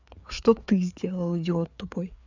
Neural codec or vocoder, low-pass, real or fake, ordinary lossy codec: codec, 16 kHz, 4 kbps, FreqCodec, larger model; 7.2 kHz; fake; none